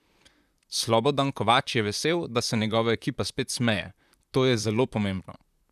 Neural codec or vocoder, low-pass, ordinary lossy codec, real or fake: vocoder, 44.1 kHz, 128 mel bands, Pupu-Vocoder; 14.4 kHz; none; fake